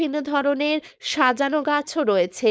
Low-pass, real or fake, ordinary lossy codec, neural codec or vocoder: none; fake; none; codec, 16 kHz, 4.8 kbps, FACodec